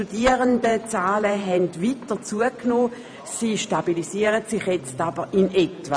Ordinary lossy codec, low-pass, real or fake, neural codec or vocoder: none; 9.9 kHz; fake; vocoder, 48 kHz, 128 mel bands, Vocos